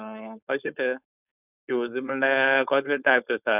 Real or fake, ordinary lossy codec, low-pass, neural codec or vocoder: fake; none; 3.6 kHz; codec, 16 kHz, 4.8 kbps, FACodec